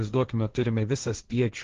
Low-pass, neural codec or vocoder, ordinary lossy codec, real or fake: 7.2 kHz; codec, 16 kHz, 1.1 kbps, Voila-Tokenizer; Opus, 16 kbps; fake